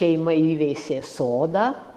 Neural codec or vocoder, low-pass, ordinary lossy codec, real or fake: autoencoder, 48 kHz, 128 numbers a frame, DAC-VAE, trained on Japanese speech; 14.4 kHz; Opus, 16 kbps; fake